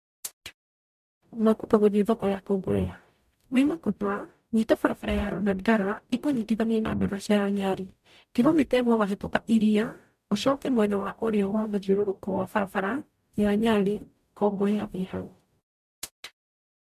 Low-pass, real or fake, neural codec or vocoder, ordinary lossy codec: 14.4 kHz; fake; codec, 44.1 kHz, 0.9 kbps, DAC; MP3, 96 kbps